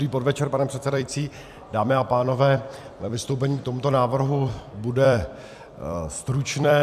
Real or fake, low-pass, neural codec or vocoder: fake; 14.4 kHz; vocoder, 44.1 kHz, 128 mel bands every 256 samples, BigVGAN v2